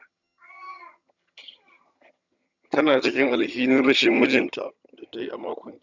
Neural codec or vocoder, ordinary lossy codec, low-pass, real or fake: vocoder, 22.05 kHz, 80 mel bands, HiFi-GAN; none; 7.2 kHz; fake